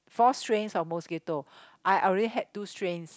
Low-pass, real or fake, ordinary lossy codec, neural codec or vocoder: none; real; none; none